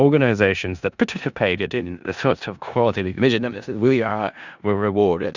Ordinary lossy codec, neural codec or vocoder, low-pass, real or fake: Opus, 64 kbps; codec, 16 kHz in and 24 kHz out, 0.4 kbps, LongCat-Audio-Codec, four codebook decoder; 7.2 kHz; fake